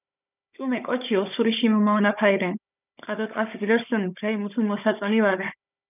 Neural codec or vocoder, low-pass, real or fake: codec, 16 kHz, 16 kbps, FunCodec, trained on Chinese and English, 50 frames a second; 3.6 kHz; fake